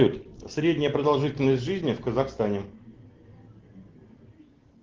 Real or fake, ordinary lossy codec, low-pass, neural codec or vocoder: real; Opus, 16 kbps; 7.2 kHz; none